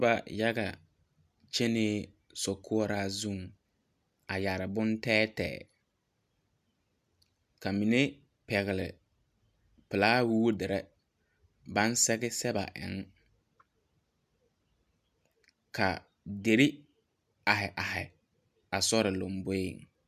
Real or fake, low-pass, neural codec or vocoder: real; 14.4 kHz; none